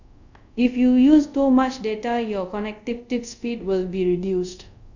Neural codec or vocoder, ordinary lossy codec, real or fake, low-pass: codec, 24 kHz, 0.5 kbps, DualCodec; none; fake; 7.2 kHz